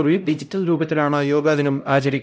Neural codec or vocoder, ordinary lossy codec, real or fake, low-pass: codec, 16 kHz, 0.5 kbps, X-Codec, HuBERT features, trained on LibriSpeech; none; fake; none